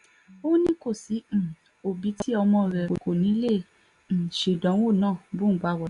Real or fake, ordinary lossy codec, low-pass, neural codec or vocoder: real; none; 10.8 kHz; none